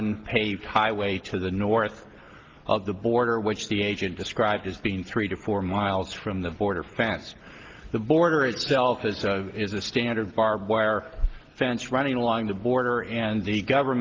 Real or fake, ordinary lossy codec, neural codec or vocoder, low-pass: real; Opus, 16 kbps; none; 7.2 kHz